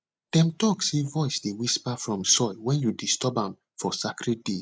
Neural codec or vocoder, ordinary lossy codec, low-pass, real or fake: none; none; none; real